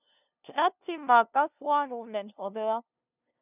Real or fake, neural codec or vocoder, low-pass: fake; codec, 16 kHz, 0.5 kbps, FunCodec, trained on LibriTTS, 25 frames a second; 3.6 kHz